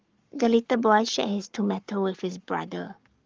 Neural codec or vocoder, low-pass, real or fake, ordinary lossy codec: codec, 44.1 kHz, 7.8 kbps, Pupu-Codec; 7.2 kHz; fake; Opus, 32 kbps